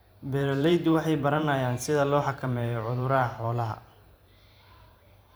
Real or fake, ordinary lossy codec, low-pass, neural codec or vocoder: fake; none; none; vocoder, 44.1 kHz, 128 mel bands every 512 samples, BigVGAN v2